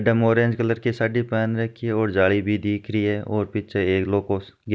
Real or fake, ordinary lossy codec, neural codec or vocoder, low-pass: real; none; none; none